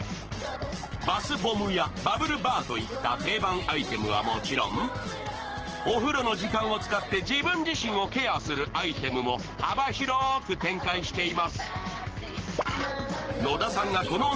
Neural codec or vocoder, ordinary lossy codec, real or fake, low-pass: codec, 44.1 kHz, 7.8 kbps, Pupu-Codec; Opus, 16 kbps; fake; 7.2 kHz